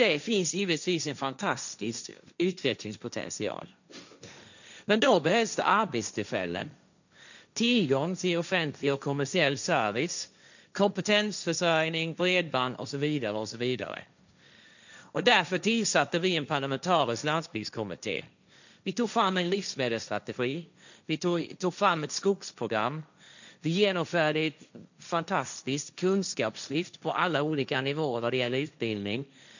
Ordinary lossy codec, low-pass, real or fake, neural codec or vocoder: none; 7.2 kHz; fake; codec, 16 kHz, 1.1 kbps, Voila-Tokenizer